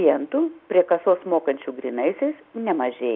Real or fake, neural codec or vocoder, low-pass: real; none; 5.4 kHz